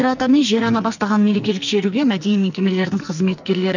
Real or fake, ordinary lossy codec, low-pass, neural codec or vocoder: fake; none; 7.2 kHz; codec, 44.1 kHz, 2.6 kbps, SNAC